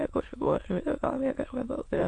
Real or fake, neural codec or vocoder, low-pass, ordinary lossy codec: fake; autoencoder, 22.05 kHz, a latent of 192 numbers a frame, VITS, trained on many speakers; 9.9 kHz; AAC, 48 kbps